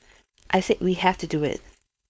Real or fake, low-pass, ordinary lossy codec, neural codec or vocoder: fake; none; none; codec, 16 kHz, 4.8 kbps, FACodec